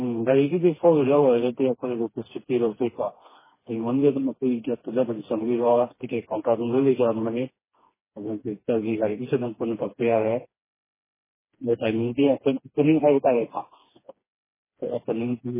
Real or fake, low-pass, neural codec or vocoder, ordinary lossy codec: fake; 3.6 kHz; codec, 16 kHz, 2 kbps, FreqCodec, smaller model; MP3, 16 kbps